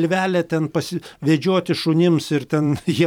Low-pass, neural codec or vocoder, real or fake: 19.8 kHz; vocoder, 44.1 kHz, 128 mel bands every 512 samples, BigVGAN v2; fake